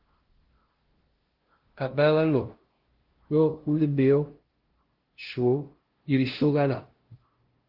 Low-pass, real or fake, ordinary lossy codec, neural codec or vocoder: 5.4 kHz; fake; Opus, 16 kbps; codec, 16 kHz, 0.5 kbps, FunCodec, trained on LibriTTS, 25 frames a second